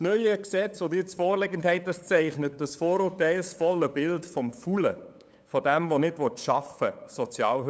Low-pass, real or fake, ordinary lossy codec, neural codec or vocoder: none; fake; none; codec, 16 kHz, 8 kbps, FunCodec, trained on LibriTTS, 25 frames a second